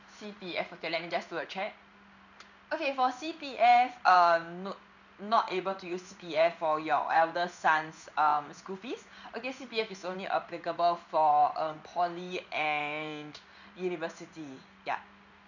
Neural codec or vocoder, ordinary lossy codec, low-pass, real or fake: codec, 16 kHz in and 24 kHz out, 1 kbps, XY-Tokenizer; none; 7.2 kHz; fake